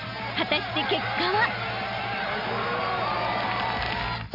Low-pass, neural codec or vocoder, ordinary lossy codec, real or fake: 5.4 kHz; none; none; real